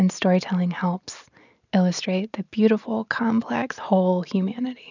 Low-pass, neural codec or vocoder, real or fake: 7.2 kHz; none; real